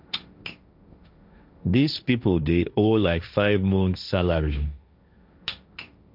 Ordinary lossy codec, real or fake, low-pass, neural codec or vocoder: none; fake; 5.4 kHz; codec, 16 kHz, 1.1 kbps, Voila-Tokenizer